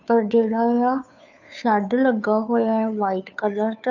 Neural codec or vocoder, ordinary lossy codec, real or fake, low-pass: codec, 16 kHz, 2 kbps, FunCodec, trained on Chinese and English, 25 frames a second; none; fake; 7.2 kHz